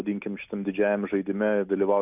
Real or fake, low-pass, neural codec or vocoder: real; 3.6 kHz; none